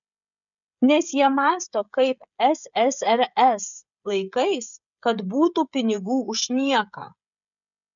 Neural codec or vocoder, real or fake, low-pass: codec, 16 kHz, 16 kbps, FreqCodec, smaller model; fake; 7.2 kHz